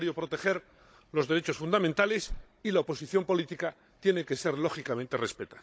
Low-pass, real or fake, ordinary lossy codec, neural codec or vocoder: none; fake; none; codec, 16 kHz, 16 kbps, FunCodec, trained on Chinese and English, 50 frames a second